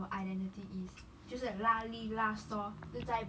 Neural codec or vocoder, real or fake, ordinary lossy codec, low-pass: none; real; none; none